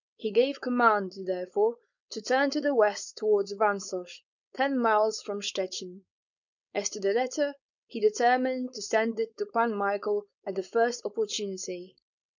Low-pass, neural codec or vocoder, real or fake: 7.2 kHz; codec, 16 kHz, 4.8 kbps, FACodec; fake